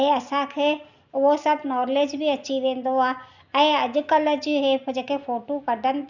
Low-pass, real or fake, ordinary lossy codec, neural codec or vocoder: 7.2 kHz; real; none; none